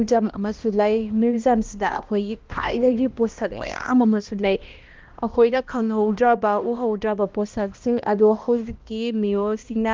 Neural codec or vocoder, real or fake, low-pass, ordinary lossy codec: codec, 16 kHz, 1 kbps, X-Codec, HuBERT features, trained on balanced general audio; fake; 7.2 kHz; Opus, 32 kbps